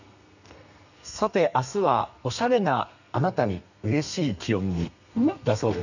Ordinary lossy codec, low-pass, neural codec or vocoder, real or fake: none; 7.2 kHz; codec, 32 kHz, 1.9 kbps, SNAC; fake